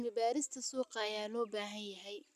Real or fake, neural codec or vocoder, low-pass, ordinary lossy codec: real; none; 14.4 kHz; none